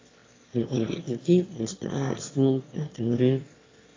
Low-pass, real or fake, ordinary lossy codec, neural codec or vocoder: 7.2 kHz; fake; AAC, 32 kbps; autoencoder, 22.05 kHz, a latent of 192 numbers a frame, VITS, trained on one speaker